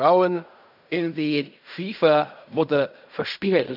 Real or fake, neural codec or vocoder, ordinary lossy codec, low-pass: fake; codec, 16 kHz in and 24 kHz out, 0.4 kbps, LongCat-Audio-Codec, fine tuned four codebook decoder; none; 5.4 kHz